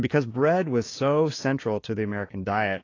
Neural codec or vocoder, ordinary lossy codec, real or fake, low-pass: codec, 16 kHz, 6 kbps, DAC; AAC, 32 kbps; fake; 7.2 kHz